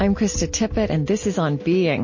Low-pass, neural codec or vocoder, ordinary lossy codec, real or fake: 7.2 kHz; none; MP3, 32 kbps; real